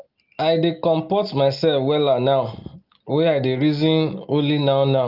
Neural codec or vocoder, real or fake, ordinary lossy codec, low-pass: none; real; Opus, 24 kbps; 5.4 kHz